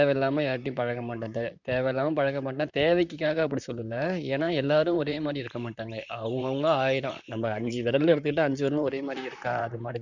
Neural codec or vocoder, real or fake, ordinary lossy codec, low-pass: vocoder, 44.1 kHz, 128 mel bands, Pupu-Vocoder; fake; none; 7.2 kHz